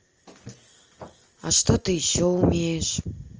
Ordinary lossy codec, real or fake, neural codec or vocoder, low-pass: Opus, 24 kbps; real; none; 7.2 kHz